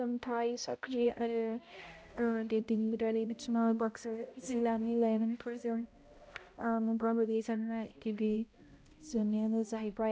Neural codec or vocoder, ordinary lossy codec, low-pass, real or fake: codec, 16 kHz, 0.5 kbps, X-Codec, HuBERT features, trained on balanced general audio; none; none; fake